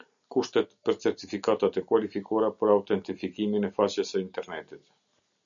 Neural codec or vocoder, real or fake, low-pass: none; real; 7.2 kHz